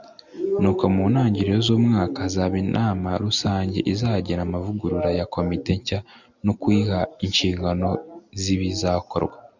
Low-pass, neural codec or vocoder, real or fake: 7.2 kHz; none; real